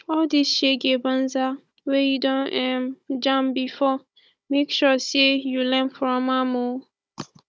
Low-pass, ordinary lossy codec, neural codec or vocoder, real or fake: none; none; codec, 16 kHz, 16 kbps, FunCodec, trained on Chinese and English, 50 frames a second; fake